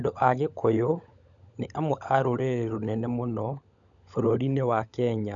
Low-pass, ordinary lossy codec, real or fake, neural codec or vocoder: 7.2 kHz; none; fake; codec, 16 kHz, 16 kbps, FunCodec, trained on LibriTTS, 50 frames a second